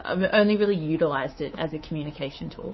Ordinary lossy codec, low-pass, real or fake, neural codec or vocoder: MP3, 24 kbps; 7.2 kHz; fake; codec, 24 kHz, 3.1 kbps, DualCodec